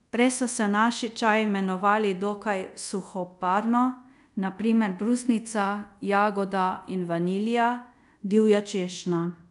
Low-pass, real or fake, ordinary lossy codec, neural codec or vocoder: 10.8 kHz; fake; none; codec, 24 kHz, 0.5 kbps, DualCodec